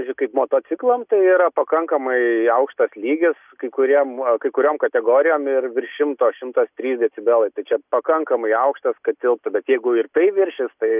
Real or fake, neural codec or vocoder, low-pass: real; none; 3.6 kHz